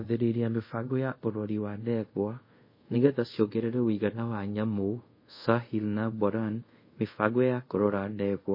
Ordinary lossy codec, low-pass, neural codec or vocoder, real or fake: MP3, 24 kbps; 5.4 kHz; codec, 24 kHz, 0.5 kbps, DualCodec; fake